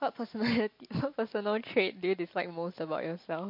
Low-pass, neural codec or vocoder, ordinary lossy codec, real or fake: 5.4 kHz; none; MP3, 32 kbps; real